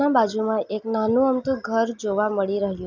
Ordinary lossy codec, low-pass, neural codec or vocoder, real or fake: none; 7.2 kHz; none; real